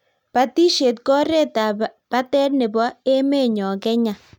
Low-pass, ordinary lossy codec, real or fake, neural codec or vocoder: 19.8 kHz; none; real; none